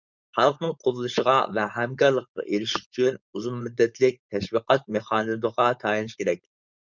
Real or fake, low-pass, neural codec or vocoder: fake; 7.2 kHz; codec, 16 kHz, 4.8 kbps, FACodec